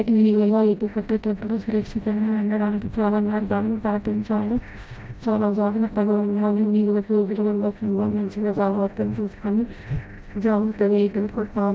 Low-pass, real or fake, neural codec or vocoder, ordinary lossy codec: none; fake; codec, 16 kHz, 0.5 kbps, FreqCodec, smaller model; none